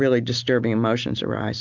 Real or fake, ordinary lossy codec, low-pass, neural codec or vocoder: real; MP3, 64 kbps; 7.2 kHz; none